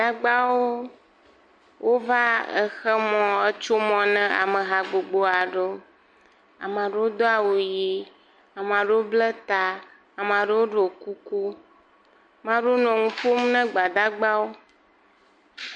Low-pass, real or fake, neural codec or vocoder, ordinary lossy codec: 9.9 kHz; real; none; MP3, 48 kbps